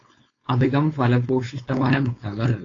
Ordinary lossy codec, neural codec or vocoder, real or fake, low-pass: AAC, 32 kbps; codec, 16 kHz, 4.8 kbps, FACodec; fake; 7.2 kHz